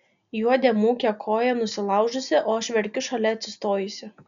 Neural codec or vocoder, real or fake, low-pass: none; real; 7.2 kHz